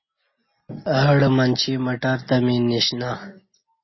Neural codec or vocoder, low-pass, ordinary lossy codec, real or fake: none; 7.2 kHz; MP3, 24 kbps; real